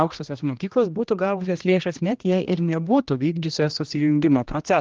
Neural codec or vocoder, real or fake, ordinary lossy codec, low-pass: codec, 16 kHz, 1 kbps, X-Codec, HuBERT features, trained on general audio; fake; Opus, 32 kbps; 7.2 kHz